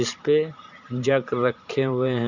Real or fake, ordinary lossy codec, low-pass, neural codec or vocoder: fake; none; 7.2 kHz; vocoder, 44.1 kHz, 128 mel bands every 512 samples, BigVGAN v2